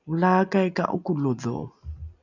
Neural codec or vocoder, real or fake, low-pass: none; real; 7.2 kHz